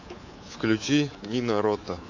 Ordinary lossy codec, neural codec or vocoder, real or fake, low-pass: AAC, 48 kbps; codec, 24 kHz, 3.1 kbps, DualCodec; fake; 7.2 kHz